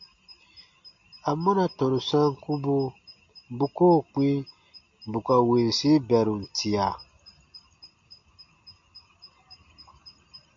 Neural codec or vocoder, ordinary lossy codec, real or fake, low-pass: none; MP3, 48 kbps; real; 7.2 kHz